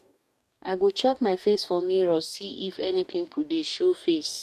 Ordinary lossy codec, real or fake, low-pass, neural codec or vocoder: none; fake; 14.4 kHz; codec, 44.1 kHz, 2.6 kbps, DAC